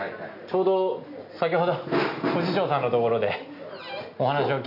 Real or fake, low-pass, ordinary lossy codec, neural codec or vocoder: real; 5.4 kHz; none; none